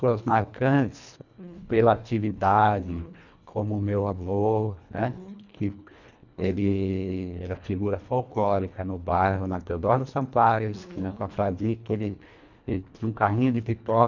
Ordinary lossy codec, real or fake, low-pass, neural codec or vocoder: none; fake; 7.2 kHz; codec, 24 kHz, 1.5 kbps, HILCodec